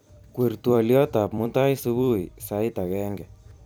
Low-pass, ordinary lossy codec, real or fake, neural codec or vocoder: none; none; fake; vocoder, 44.1 kHz, 128 mel bands every 256 samples, BigVGAN v2